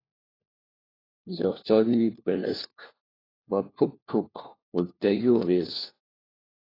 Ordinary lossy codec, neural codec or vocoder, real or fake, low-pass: AAC, 24 kbps; codec, 16 kHz, 1 kbps, FunCodec, trained on LibriTTS, 50 frames a second; fake; 5.4 kHz